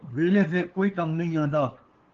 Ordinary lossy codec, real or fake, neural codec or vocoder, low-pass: Opus, 16 kbps; fake; codec, 16 kHz, 2 kbps, FunCodec, trained on LibriTTS, 25 frames a second; 7.2 kHz